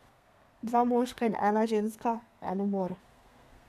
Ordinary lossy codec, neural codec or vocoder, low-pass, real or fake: none; codec, 32 kHz, 1.9 kbps, SNAC; 14.4 kHz; fake